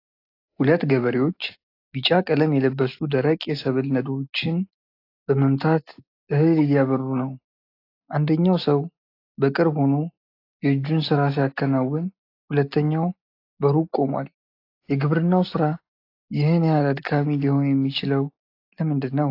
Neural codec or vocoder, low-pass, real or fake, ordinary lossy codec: none; 5.4 kHz; real; AAC, 32 kbps